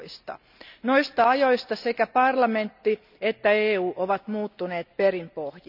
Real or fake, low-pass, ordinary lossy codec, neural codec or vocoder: real; 5.4 kHz; none; none